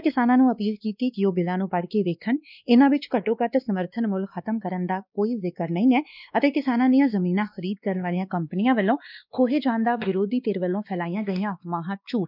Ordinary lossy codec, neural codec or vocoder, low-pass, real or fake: none; codec, 16 kHz, 2 kbps, X-Codec, WavLM features, trained on Multilingual LibriSpeech; 5.4 kHz; fake